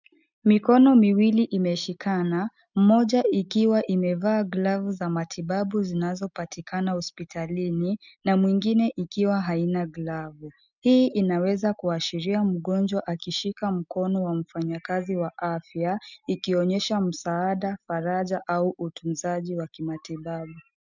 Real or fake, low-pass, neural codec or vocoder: real; 7.2 kHz; none